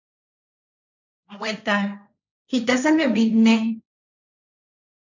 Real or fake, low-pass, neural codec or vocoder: fake; 7.2 kHz; codec, 16 kHz, 1.1 kbps, Voila-Tokenizer